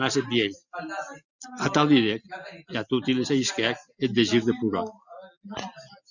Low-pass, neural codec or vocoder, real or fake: 7.2 kHz; none; real